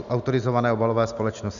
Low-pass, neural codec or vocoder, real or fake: 7.2 kHz; none; real